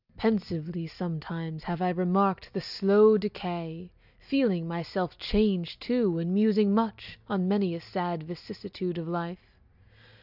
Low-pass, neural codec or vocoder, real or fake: 5.4 kHz; none; real